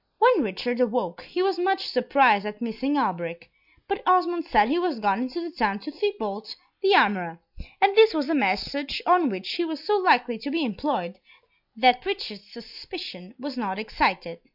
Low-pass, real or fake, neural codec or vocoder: 5.4 kHz; real; none